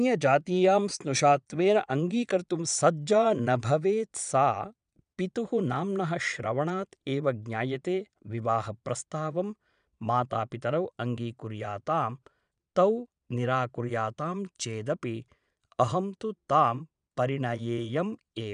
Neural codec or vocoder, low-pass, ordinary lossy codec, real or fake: vocoder, 22.05 kHz, 80 mel bands, Vocos; 9.9 kHz; none; fake